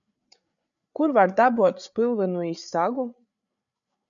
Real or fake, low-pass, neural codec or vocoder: fake; 7.2 kHz; codec, 16 kHz, 8 kbps, FreqCodec, larger model